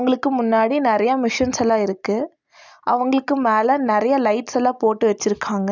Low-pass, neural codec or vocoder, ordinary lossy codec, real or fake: 7.2 kHz; none; none; real